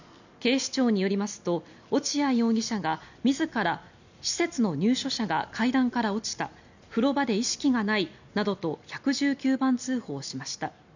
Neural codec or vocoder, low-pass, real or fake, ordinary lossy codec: none; 7.2 kHz; real; none